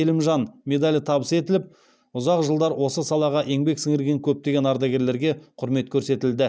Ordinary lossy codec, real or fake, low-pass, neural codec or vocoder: none; real; none; none